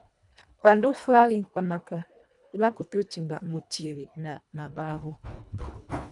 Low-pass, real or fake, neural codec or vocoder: 10.8 kHz; fake; codec, 24 kHz, 1.5 kbps, HILCodec